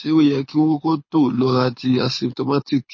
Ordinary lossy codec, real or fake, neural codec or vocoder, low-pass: MP3, 32 kbps; fake; codec, 24 kHz, 6 kbps, HILCodec; 7.2 kHz